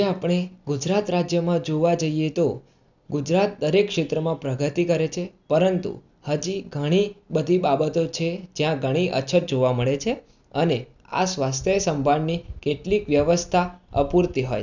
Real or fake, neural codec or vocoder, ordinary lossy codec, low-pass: real; none; none; 7.2 kHz